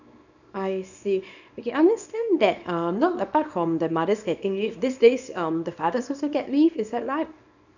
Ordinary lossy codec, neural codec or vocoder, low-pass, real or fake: none; codec, 24 kHz, 0.9 kbps, WavTokenizer, small release; 7.2 kHz; fake